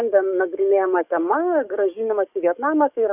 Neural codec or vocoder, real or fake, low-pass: codec, 44.1 kHz, 7.8 kbps, DAC; fake; 3.6 kHz